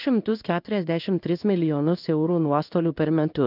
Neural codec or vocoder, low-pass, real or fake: codec, 16 kHz in and 24 kHz out, 1 kbps, XY-Tokenizer; 5.4 kHz; fake